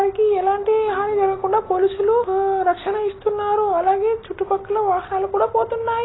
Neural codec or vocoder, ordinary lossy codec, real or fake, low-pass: none; AAC, 16 kbps; real; 7.2 kHz